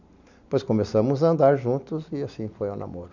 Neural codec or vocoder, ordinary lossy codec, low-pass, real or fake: none; none; 7.2 kHz; real